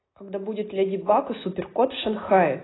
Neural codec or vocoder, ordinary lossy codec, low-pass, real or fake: none; AAC, 16 kbps; 7.2 kHz; real